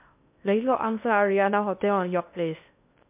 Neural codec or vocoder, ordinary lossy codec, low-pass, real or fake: codec, 16 kHz in and 24 kHz out, 0.6 kbps, FocalCodec, streaming, 2048 codes; MP3, 32 kbps; 3.6 kHz; fake